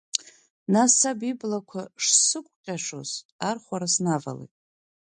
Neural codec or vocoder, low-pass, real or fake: none; 9.9 kHz; real